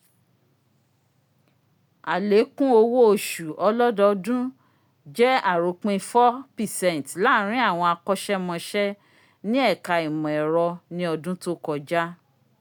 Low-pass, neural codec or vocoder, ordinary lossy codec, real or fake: 19.8 kHz; none; none; real